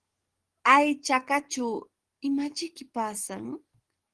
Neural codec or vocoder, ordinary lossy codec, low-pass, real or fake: codec, 44.1 kHz, 7.8 kbps, DAC; Opus, 16 kbps; 10.8 kHz; fake